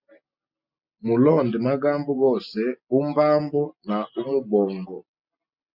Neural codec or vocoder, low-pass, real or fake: none; 5.4 kHz; real